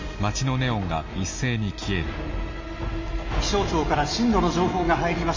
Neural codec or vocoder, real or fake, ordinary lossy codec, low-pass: none; real; MP3, 48 kbps; 7.2 kHz